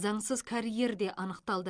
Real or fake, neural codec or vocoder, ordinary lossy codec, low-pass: real; none; Opus, 32 kbps; 9.9 kHz